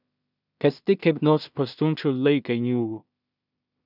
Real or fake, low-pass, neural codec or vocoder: fake; 5.4 kHz; codec, 16 kHz in and 24 kHz out, 0.4 kbps, LongCat-Audio-Codec, two codebook decoder